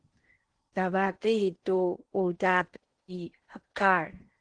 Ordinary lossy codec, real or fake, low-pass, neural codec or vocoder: Opus, 16 kbps; fake; 9.9 kHz; codec, 16 kHz in and 24 kHz out, 0.8 kbps, FocalCodec, streaming, 65536 codes